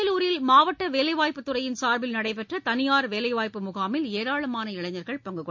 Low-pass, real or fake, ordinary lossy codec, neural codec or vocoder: 7.2 kHz; real; MP3, 48 kbps; none